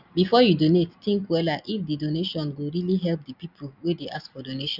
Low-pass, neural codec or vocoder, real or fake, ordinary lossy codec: 5.4 kHz; none; real; none